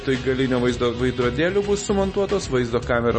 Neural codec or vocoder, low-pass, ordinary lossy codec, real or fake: none; 10.8 kHz; MP3, 32 kbps; real